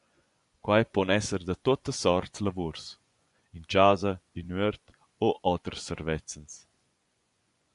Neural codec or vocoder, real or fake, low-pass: vocoder, 24 kHz, 100 mel bands, Vocos; fake; 10.8 kHz